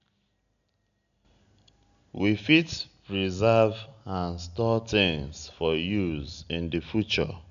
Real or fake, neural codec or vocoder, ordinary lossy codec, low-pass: real; none; none; 7.2 kHz